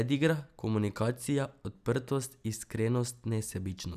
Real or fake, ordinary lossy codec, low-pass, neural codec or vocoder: real; none; 14.4 kHz; none